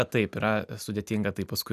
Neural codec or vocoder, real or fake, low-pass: none; real; 14.4 kHz